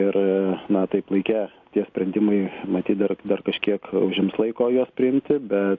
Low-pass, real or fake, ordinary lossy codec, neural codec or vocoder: 7.2 kHz; real; AAC, 48 kbps; none